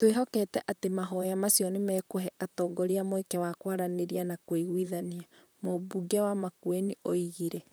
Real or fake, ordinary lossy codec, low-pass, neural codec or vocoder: fake; none; none; vocoder, 44.1 kHz, 128 mel bands, Pupu-Vocoder